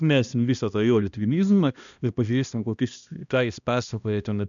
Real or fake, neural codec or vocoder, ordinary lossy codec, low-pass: fake; codec, 16 kHz, 1 kbps, X-Codec, HuBERT features, trained on balanced general audio; MP3, 96 kbps; 7.2 kHz